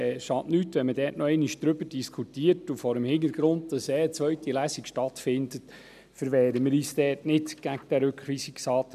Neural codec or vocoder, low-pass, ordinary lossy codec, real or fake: none; 14.4 kHz; none; real